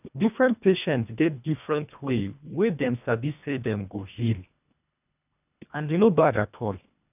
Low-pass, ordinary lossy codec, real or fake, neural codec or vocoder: 3.6 kHz; none; fake; codec, 24 kHz, 1.5 kbps, HILCodec